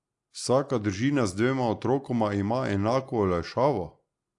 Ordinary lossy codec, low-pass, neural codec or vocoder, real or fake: AAC, 64 kbps; 10.8 kHz; none; real